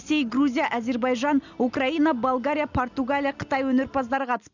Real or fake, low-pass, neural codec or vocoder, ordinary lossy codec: real; 7.2 kHz; none; none